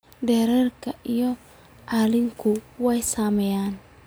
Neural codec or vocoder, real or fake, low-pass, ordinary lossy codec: none; real; none; none